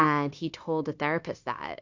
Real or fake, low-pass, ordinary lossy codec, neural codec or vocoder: fake; 7.2 kHz; AAC, 48 kbps; codec, 16 kHz, 0.9 kbps, LongCat-Audio-Codec